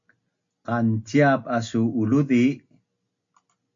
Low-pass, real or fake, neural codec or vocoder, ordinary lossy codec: 7.2 kHz; real; none; MP3, 48 kbps